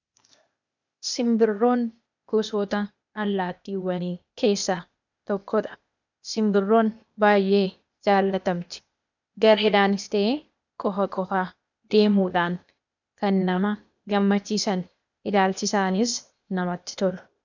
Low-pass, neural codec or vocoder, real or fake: 7.2 kHz; codec, 16 kHz, 0.8 kbps, ZipCodec; fake